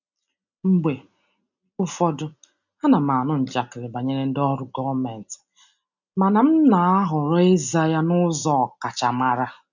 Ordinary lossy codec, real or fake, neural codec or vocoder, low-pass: none; real; none; 7.2 kHz